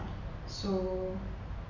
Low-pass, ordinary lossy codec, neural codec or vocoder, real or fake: 7.2 kHz; none; none; real